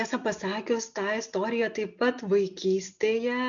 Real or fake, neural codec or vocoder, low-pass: real; none; 7.2 kHz